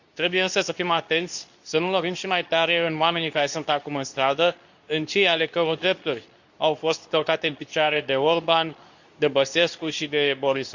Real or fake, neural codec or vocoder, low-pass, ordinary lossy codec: fake; codec, 24 kHz, 0.9 kbps, WavTokenizer, medium speech release version 2; 7.2 kHz; none